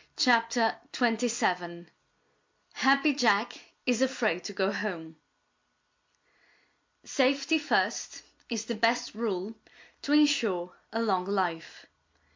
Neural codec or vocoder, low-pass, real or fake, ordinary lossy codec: none; 7.2 kHz; real; MP3, 48 kbps